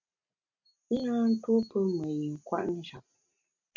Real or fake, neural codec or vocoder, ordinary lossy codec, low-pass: real; none; MP3, 64 kbps; 7.2 kHz